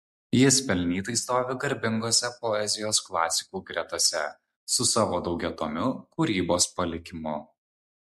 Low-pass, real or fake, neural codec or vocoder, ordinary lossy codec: 14.4 kHz; real; none; MP3, 64 kbps